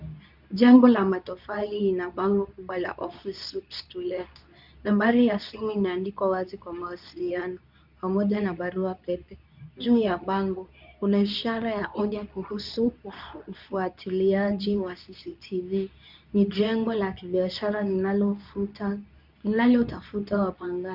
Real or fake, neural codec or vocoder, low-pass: fake; codec, 24 kHz, 0.9 kbps, WavTokenizer, medium speech release version 2; 5.4 kHz